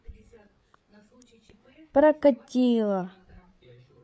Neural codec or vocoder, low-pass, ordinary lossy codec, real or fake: codec, 16 kHz, 8 kbps, FreqCodec, larger model; none; none; fake